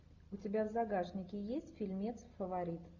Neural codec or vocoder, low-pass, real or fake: none; 7.2 kHz; real